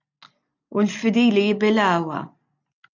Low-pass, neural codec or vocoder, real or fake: 7.2 kHz; none; real